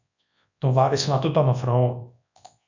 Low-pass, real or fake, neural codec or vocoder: 7.2 kHz; fake; codec, 24 kHz, 0.9 kbps, WavTokenizer, large speech release